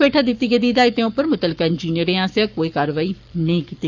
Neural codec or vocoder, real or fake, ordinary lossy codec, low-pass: codec, 44.1 kHz, 7.8 kbps, Pupu-Codec; fake; none; 7.2 kHz